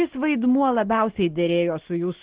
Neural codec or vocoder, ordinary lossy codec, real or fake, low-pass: none; Opus, 16 kbps; real; 3.6 kHz